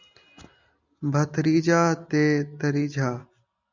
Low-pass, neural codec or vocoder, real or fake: 7.2 kHz; none; real